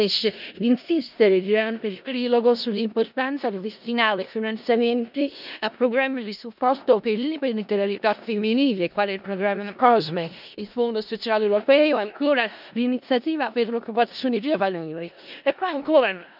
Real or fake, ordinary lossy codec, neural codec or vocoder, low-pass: fake; none; codec, 16 kHz in and 24 kHz out, 0.4 kbps, LongCat-Audio-Codec, four codebook decoder; 5.4 kHz